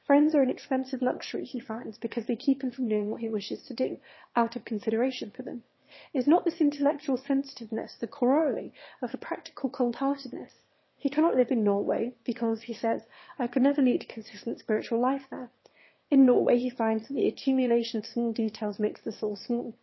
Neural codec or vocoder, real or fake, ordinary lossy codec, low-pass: autoencoder, 22.05 kHz, a latent of 192 numbers a frame, VITS, trained on one speaker; fake; MP3, 24 kbps; 7.2 kHz